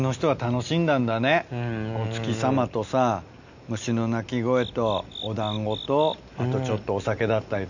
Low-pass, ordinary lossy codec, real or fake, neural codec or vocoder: 7.2 kHz; none; real; none